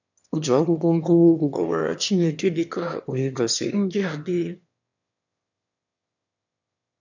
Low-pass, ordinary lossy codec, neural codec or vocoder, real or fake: 7.2 kHz; none; autoencoder, 22.05 kHz, a latent of 192 numbers a frame, VITS, trained on one speaker; fake